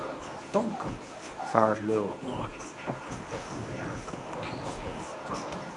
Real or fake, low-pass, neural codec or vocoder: fake; 10.8 kHz; codec, 24 kHz, 0.9 kbps, WavTokenizer, medium speech release version 1